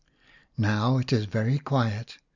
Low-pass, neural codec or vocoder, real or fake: 7.2 kHz; none; real